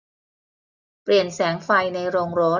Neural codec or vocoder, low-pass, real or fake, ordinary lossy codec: none; 7.2 kHz; real; none